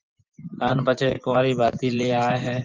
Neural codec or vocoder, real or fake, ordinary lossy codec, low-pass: vocoder, 24 kHz, 100 mel bands, Vocos; fake; Opus, 32 kbps; 7.2 kHz